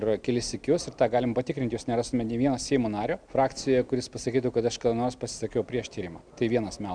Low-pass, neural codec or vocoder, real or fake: 9.9 kHz; none; real